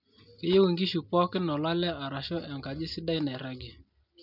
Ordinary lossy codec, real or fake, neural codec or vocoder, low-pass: none; real; none; 5.4 kHz